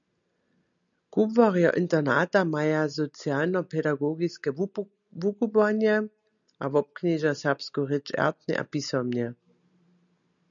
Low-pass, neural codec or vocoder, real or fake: 7.2 kHz; none; real